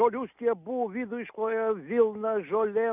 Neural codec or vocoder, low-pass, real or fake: none; 3.6 kHz; real